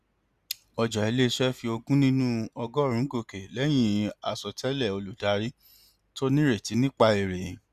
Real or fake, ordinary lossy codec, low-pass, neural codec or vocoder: real; Opus, 64 kbps; 14.4 kHz; none